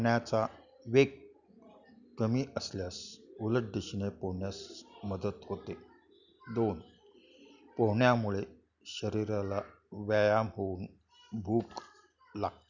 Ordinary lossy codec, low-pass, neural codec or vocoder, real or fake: none; 7.2 kHz; none; real